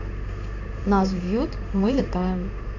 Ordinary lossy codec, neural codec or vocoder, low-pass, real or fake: none; codec, 16 kHz in and 24 kHz out, 1 kbps, XY-Tokenizer; 7.2 kHz; fake